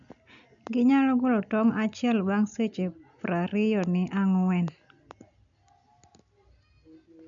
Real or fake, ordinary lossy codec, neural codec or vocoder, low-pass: real; none; none; 7.2 kHz